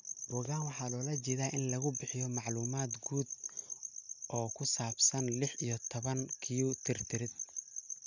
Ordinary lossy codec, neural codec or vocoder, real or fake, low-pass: none; none; real; 7.2 kHz